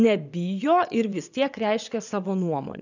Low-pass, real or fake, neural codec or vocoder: 7.2 kHz; fake; vocoder, 22.05 kHz, 80 mel bands, WaveNeXt